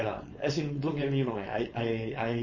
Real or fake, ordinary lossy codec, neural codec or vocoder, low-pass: fake; MP3, 32 kbps; codec, 16 kHz, 4.8 kbps, FACodec; 7.2 kHz